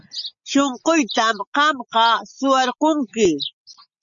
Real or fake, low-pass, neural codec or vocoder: real; 7.2 kHz; none